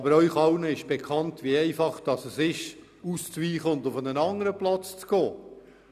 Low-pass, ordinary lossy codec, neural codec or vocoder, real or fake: 14.4 kHz; none; none; real